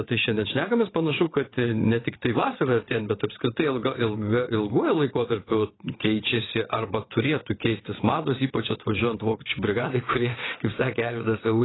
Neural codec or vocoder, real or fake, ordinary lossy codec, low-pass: vocoder, 22.05 kHz, 80 mel bands, WaveNeXt; fake; AAC, 16 kbps; 7.2 kHz